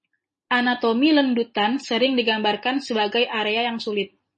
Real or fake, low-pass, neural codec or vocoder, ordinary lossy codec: real; 10.8 kHz; none; MP3, 32 kbps